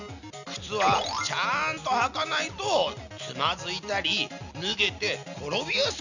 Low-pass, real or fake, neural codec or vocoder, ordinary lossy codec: 7.2 kHz; real; none; none